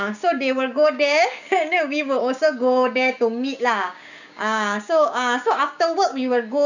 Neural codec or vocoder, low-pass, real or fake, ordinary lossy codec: codec, 16 kHz, 6 kbps, DAC; 7.2 kHz; fake; none